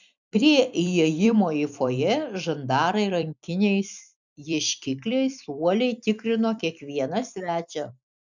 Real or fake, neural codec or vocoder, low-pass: fake; vocoder, 44.1 kHz, 128 mel bands every 256 samples, BigVGAN v2; 7.2 kHz